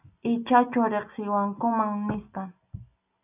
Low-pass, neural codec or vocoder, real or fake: 3.6 kHz; none; real